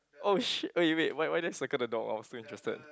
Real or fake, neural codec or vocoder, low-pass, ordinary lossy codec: real; none; none; none